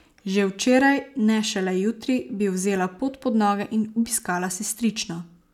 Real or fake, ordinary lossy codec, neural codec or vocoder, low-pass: real; none; none; 19.8 kHz